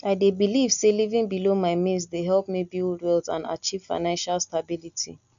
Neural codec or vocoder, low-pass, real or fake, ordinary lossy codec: none; 7.2 kHz; real; none